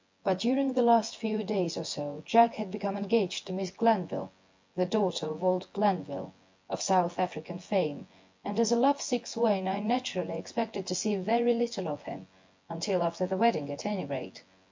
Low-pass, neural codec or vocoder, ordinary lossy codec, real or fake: 7.2 kHz; vocoder, 24 kHz, 100 mel bands, Vocos; MP3, 48 kbps; fake